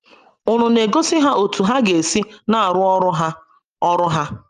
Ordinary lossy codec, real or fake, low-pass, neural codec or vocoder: Opus, 32 kbps; real; 14.4 kHz; none